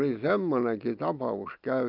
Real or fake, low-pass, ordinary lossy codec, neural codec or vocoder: real; 5.4 kHz; Opus, 32 kbps; none